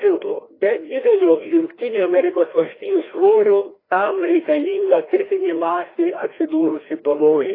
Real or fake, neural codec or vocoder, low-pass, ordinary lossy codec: fake; codec, 16 kHz, 1 kbps, FreqCodec, larger model; 5.4 kHz; AAC, 24 kbps